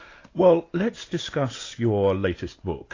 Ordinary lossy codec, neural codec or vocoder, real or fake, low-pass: AAC, 32 kbps; none; real; 7.2 kHz